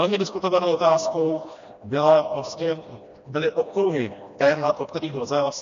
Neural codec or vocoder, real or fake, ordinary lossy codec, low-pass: codec, 16 kHz, 1 kbps, FreqCodec, smaller model; fake; MP3, 64 kbps; 7.2 kHz